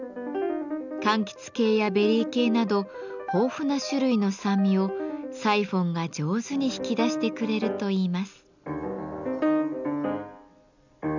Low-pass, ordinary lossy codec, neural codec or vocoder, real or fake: 7.2 kHz; none; none; real